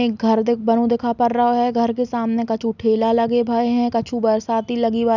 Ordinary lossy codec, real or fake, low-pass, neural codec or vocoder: none; real; 7.2 kHz; none